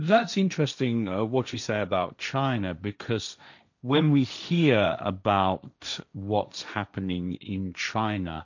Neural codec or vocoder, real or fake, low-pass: codec, 16 kHz, 1.1 kbps, Voila-Tokenizer; fake; 7.2 kHz